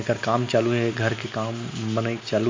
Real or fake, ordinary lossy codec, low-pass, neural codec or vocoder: real; MP3, 48 kbps; 7.2 kHz; none